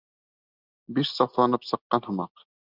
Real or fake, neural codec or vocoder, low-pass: real; none; 5.4 kHz